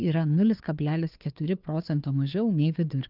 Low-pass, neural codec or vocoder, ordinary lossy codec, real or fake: 5.4 kHz; codec, 16 kHz, 2 kbps, FunCodec, trained on Chinese and English, 25 frames a second; Opus, 24 kbps; fake